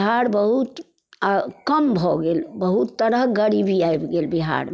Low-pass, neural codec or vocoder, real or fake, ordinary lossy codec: none; none; real; none